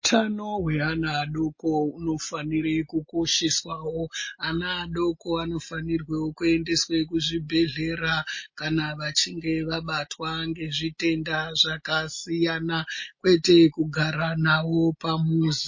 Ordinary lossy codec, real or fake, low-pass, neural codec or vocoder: MP3, 32 kbps; real; 7.2 kHz; none